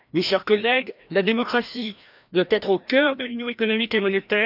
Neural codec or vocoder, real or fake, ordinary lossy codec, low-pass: codec, 16 kHz, 1 kbps, FreqCodec, larger model; fake; none; 5.4 kHz